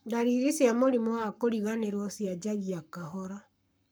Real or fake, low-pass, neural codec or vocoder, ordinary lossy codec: fake; none; codec, 44.1 kHz, 7.8 kbps, Pupu-Codec; none